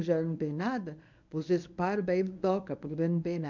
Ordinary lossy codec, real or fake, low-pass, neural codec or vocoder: none; fake; 7.2 kHz; codec, 24 kHz, 0.9 kbps, WavTokenizer, medium speech release version 1